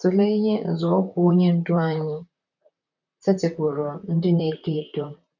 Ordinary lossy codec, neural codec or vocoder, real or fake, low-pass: none; vocoder, 44.1 kHz, 128 mel bands, Pupu-Vocoder; fake; 7.2 kHz